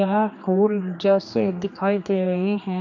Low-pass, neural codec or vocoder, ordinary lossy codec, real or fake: 7.2 kHz; codec, 16 kHz, 2 kbps, X-Codec, HuBERT features, trained on general audio; none; fake